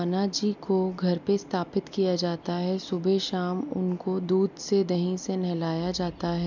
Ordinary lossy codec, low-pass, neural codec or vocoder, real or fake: none; 7.2 kHz; none; real